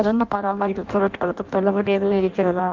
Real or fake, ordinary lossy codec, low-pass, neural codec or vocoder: fake; Opus, 24 kbps; 7.2 kHz; codec, 16 kHz in and 24 kHz out, 0.6 kbps, FireRedTTS-2 codec